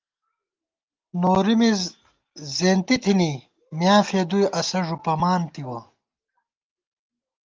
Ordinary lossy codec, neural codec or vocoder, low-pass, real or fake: Opus, 32 kbps; none; 7.2 kHz; real